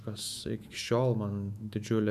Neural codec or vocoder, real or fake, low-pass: autoencoder, 48 kHz, 128 numbers a frame, DAC-VAE, trained on Japanese speech; fake; 14.4 kHz